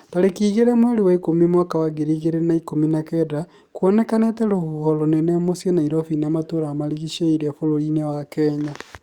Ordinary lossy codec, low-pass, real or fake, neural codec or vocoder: Opus, 64 kbps; 19.8 kHz; fake; codec, 44.1 kHz, 7.8 kbps, DAC